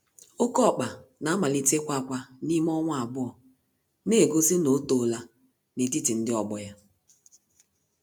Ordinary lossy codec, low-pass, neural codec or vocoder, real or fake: none; none; none; real